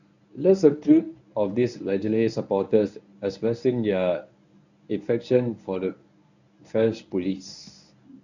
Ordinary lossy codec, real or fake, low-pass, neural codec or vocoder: none; fake; 7.2 kHz; codec, 24 kHz, 0.9 kbps, WavTokenizer, medium speech release version 1